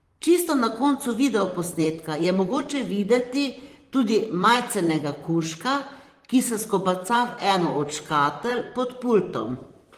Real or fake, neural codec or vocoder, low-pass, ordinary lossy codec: fake; vocoder, 44.1 kHz, 128 mel bands, Pupu-Vocoder; 14.4 kHz; Opus, 24 kbps